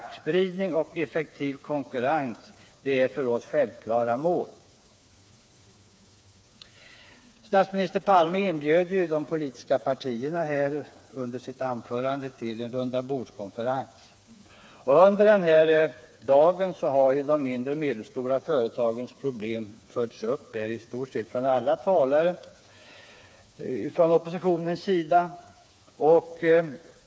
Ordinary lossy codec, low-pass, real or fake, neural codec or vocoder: none; none; fake; codec, 16 kHz, 4 kbps, FreqCodec, smaller model